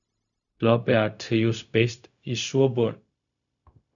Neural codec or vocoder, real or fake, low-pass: codec, 16 kHz, 0.4 kbps, LongCat-Audio-Codec; fake; 7.2 kHz